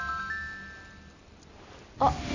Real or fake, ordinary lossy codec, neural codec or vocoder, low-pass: real; none; none; 7.2 kHz